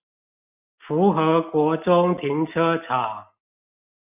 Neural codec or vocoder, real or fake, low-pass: none; real; 3.6 kHz